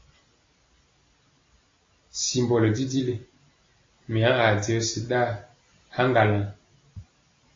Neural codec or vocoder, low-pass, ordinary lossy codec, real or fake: none; 7.2 kHz; AAC, 32 kbps; real